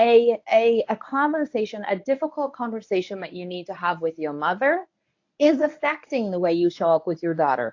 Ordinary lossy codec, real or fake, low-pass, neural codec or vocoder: AAC, 48 kbps; fake; 7.2 kHz; codec, 24 kHz, 0.9 kbps, WavTokenizer, medium speech release version 1